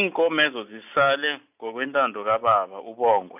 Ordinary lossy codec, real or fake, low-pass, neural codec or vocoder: none; real; 3.6 kHz; none